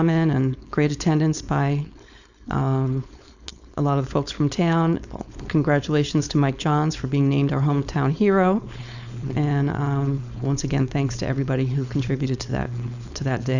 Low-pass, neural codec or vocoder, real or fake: 7.2 kHz; codec, 16 kHz, 4.8 kbps, FACodec; fake